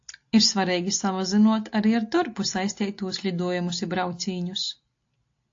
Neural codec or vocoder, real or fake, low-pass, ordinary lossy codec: none; real; 7.2 kHz; AAC, 48 kbps